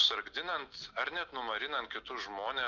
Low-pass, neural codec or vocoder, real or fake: 7.2 kHz; none; real